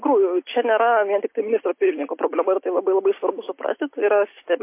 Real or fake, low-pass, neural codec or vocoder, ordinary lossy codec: real; 3.6 kHz; none; MP3, 24 kbps